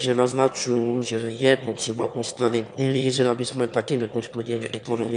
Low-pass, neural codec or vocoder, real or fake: 9.9 kHz; autoencoder, 22.05 kHz, a latent of 192 numbers a frame, VITS, trained on one speaker; fake